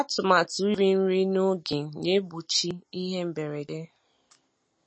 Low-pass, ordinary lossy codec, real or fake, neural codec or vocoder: 9.9 kHz; MP3, 32 kbps; real; none